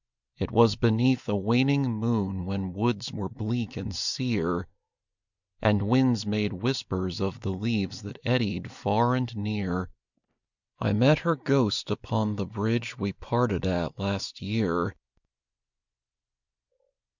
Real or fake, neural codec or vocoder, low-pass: real; none; 7.2 kHz